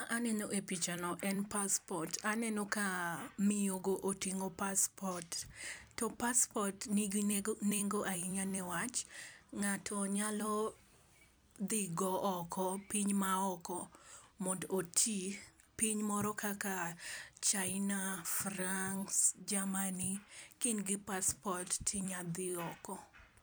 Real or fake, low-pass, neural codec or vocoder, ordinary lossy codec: fake; none; vocoder, 44.1 kHz, 128 mel bands every 256 samples, BigVGAN v2; none